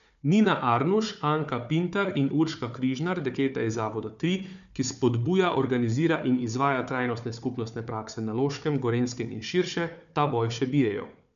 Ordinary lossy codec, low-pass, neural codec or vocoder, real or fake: none; 7.2 kHz; codec, 16 kHz, 4 kbps, FunCodec, trained on Chinese and English, 50 frames a second; fake